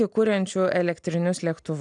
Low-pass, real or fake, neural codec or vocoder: 9.9 kHz; real; none